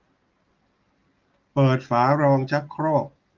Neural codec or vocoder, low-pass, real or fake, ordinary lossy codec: none; 7.2 kHz; real; Opus, 32 kbps